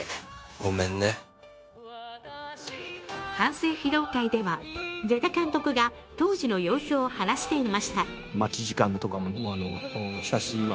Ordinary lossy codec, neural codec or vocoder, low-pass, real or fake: none; codec, 16 kHz, 0.9 kbps, LongCat-Audio-Codec; none; fake